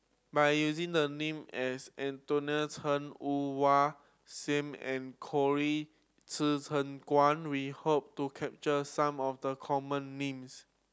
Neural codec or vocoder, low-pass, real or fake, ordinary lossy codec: none; none; real; none